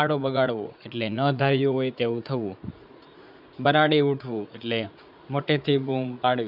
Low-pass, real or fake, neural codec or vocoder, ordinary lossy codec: 5.4 kHz; fake; vocoder, 44.1 kHz, 80 mel bands, Vocos; none